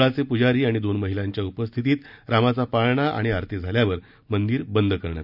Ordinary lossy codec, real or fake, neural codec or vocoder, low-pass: none; real; none; 5.4 kHz